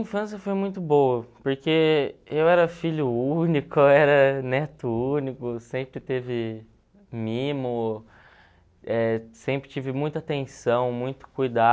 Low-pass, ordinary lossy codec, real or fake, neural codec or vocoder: none; none; real; none